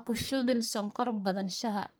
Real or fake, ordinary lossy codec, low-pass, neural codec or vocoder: fake; none; none; codec, 44.1 kHz, 1.7 kbps, Pupu-Codec